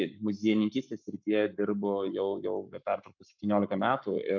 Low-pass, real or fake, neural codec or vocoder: 7.2 kHz; fake; codec, 44.1 kHz, 7.8 kbps, Pupu-Codec